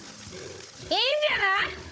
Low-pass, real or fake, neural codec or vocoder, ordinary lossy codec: none; fake; codec, 16 kHz, 16 kbps, FunCodec, trained on Chinese and English, 50 frames a second; none